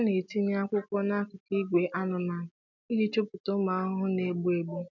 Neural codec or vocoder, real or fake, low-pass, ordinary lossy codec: none; real; 7.2 kHz; none